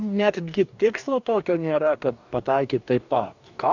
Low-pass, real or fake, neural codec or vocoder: 7.2 kHz; fake; codec, 44.1 kHz, 2.6 kbps, DAC